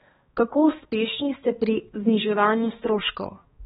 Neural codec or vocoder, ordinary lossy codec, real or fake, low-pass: codec, 16 kHz, 2 kbps, X-Codec, HuBERT features, trained on balanced general audio; AAC, 16 kbps; fake; 7.2 kHz